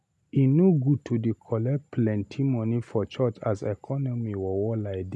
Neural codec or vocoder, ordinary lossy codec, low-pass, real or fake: none; none; none; real